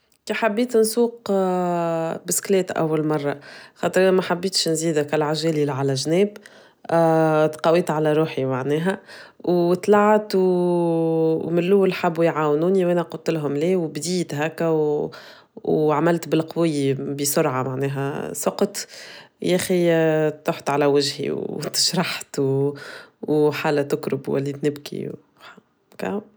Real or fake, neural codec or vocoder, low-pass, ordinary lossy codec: real; none; none; none